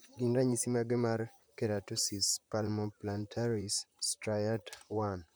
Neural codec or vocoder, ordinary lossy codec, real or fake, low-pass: vocoder, 44.1 kHz, 128 mel bands, Pupu-Vocoder; none; fake; none